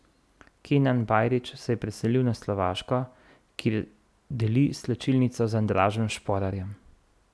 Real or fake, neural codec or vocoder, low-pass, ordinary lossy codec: real; none; none; none